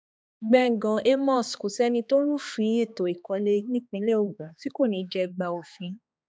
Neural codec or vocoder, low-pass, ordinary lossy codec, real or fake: codec, 16 kHz, 2 kbps, X-Codec, HuBERT features, trained on balanced general audio; none; none; fake